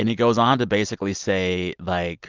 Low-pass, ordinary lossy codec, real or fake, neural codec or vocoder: 7.2 kHz; Opus, 32 kbps; real; none